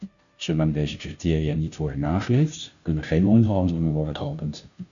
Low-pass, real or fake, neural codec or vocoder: 7.2 kHz; fake; codec, 16 kHz, 0.5 kbps, FunCodec, trained on Chinese and English, 25 frames a second